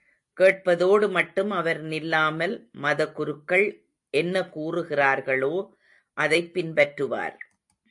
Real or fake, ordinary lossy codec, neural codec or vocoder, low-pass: real; AAC, 64 kbps; none; 10.8 kHz